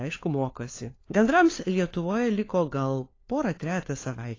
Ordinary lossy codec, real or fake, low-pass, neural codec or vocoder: AAC, 32 kbps; fake; 7.2 kHz; codec, 16 kHz, 4 kbps, FunCodec, trained on LibriTTS, 50 frames a second